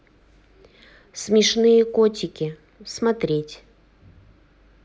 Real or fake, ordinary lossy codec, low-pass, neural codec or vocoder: real; none; none; none